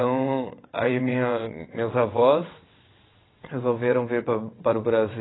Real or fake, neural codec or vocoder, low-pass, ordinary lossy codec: fake; vocoder, 22.05 kHz, 80 mel bands, WaveNeXt; 7.2 kHz; AAC, 16 kbps